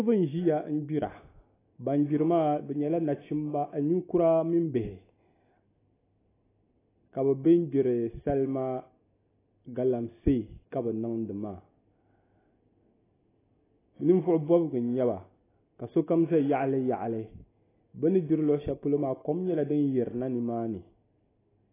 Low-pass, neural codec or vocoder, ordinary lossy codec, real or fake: 3.6 kHz; none; AAC, 16 kbps; real